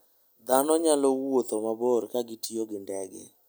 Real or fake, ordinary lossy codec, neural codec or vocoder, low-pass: real; none; none; none